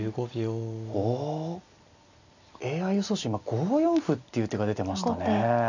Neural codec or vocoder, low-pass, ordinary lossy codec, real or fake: none; 7.2 kHz; none; real